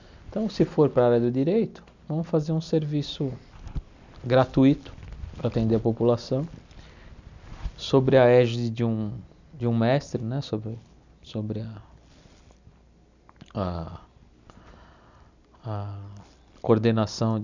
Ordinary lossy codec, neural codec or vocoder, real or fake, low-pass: none; none; real; 7.2 kHz